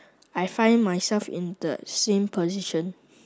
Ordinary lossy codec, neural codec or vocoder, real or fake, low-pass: none; none; real; none